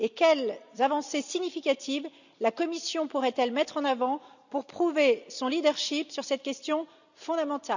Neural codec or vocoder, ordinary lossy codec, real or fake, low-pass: none; none; real; 7.2 kHz